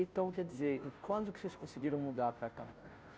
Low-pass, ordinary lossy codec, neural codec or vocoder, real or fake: none; none; codec, 16 kHz, 0.5 kbps, FunCodec, trained on Chinese and English, 25 frames a second; fake